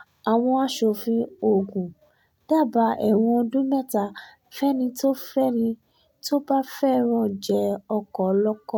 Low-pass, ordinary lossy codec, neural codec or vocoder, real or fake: 19.8 kHz; none; none; real